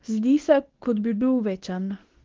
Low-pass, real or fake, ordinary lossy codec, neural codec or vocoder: 7.2 kHz; fake; Opus, 16 kbps; codec, 24 kHz, 0.9 kbps, WavTokenizer, medium speech release version 2